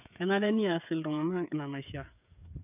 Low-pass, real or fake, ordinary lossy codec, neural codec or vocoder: 3.6 kHz; fake; none; codec, 16 kHz, 8 kbps, FreqCodec, smaller model